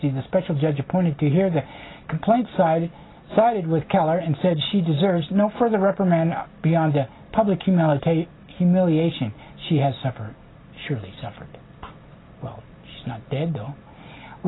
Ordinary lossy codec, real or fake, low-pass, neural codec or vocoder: AAC, 16 kbps; real; 7.2 kHz; none